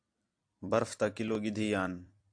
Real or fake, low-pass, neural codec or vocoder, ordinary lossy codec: fake; 9.9 kHz; vocoder, 44.1 kHz, 128 mel bands every 256 samples, BigVGAN v2; AAC, 64 kbps